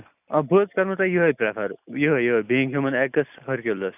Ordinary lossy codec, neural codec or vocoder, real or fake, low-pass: none; none; real; 3.6 kHz